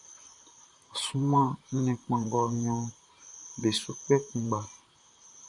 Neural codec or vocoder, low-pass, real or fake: vocoder, 44.1 kHz, 128 mel bands, Pupu-Vocoder; 10.8 kHz; fake